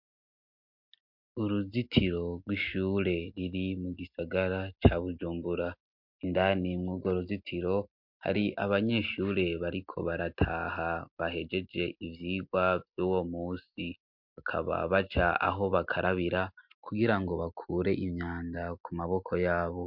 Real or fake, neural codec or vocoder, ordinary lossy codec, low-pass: real; none; MP3, 48 kbps; 5.4 kHz